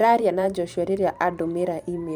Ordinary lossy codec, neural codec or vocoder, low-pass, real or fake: none; vocoder, 44.1 kHz, 128 mel bands every 512 samples, BigVGAN v2; 19.8 kHz; fake